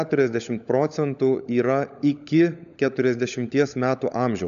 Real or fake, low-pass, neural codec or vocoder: fake; 7.2 kHz; codec, 16 kHz, 16 kbps, FunCodec, trained on LibriTTS, 50 frames a second